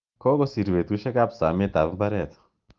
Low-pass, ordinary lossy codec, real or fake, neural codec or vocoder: 7.2 kHz; Opus, 32 kbps; real; none